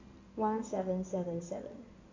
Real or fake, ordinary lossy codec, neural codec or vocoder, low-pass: fake; MP3, 64 kbps; codec, 16 kHz in and 24 kHz out, 2.2 kbps, FireRedTTS-2 codec; 7.2 kHz